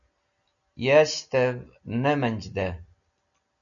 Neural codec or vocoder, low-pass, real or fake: none; 7.2 kHz; real